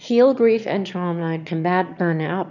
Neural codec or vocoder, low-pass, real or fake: autoencoder, 22.05 kHz, a latent of 192 numbers a frame, VITS, trained on one speaker; 7.2 kHz; fake